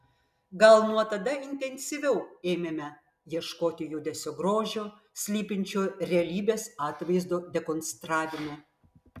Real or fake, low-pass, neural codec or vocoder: real; 14.4 kHz; none